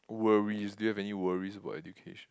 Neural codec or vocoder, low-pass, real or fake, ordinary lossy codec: none; none; real; none